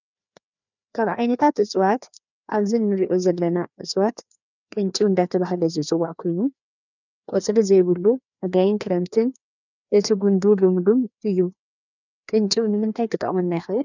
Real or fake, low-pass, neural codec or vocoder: fake; 7.2 kHz; codec, 16 kHz, 2 kbps, FreqCodec, larger model